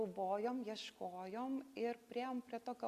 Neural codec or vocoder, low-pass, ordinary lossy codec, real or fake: none; 14.4 kHz; Opus, 64 kbps; real